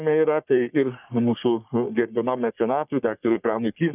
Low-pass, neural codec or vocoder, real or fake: 3.6 kHz; codec, 44.1 kHz, 3.4 kbps, Pupu-Codec; fake